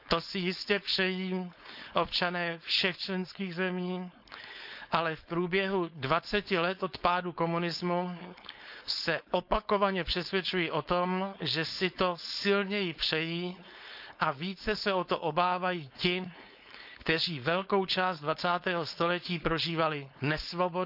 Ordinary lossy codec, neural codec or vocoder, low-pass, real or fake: none; codec, 16 kHz, 4.8 kbps, FACodec; 5.4 kHz; fake